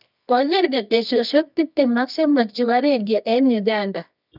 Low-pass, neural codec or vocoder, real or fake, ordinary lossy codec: 5.4 kHz; codec, 24 kHz, 0.9 kbps, WavTokenizer, medium music audio release; fake; none